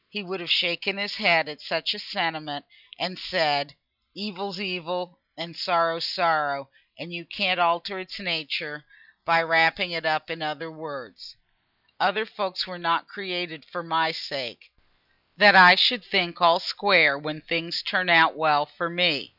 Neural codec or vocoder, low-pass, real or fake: none; 5.4 kHz; real